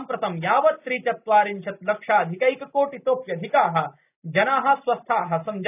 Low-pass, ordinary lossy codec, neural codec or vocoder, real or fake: 3.6 kHz; none; none; real